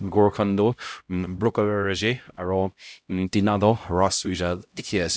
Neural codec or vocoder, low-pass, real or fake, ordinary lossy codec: codec, 16 kHz, 0.5 kbps, X-Codec, HuBERT features, trained on LibriSpeech; none; fake; none